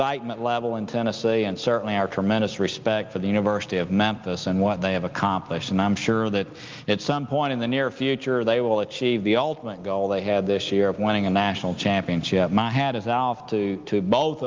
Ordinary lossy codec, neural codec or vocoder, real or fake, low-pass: Opus, 32 kbps; none; real; 7.2 kHz